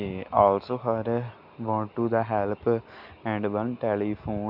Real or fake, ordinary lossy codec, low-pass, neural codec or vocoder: real; AAC, 48 kbps; 5.4 kHz; none